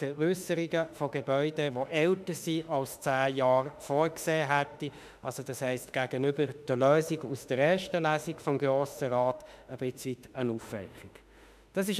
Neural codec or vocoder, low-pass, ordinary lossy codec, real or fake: autoencoder, 48 kHz, 32 numbers a frame, DAC-VAE, trained on Japanese speech; 14.4 kHz; none; fake